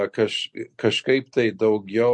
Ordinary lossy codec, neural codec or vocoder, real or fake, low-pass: MP3, 48 kbps; none; real; 10.8 kHz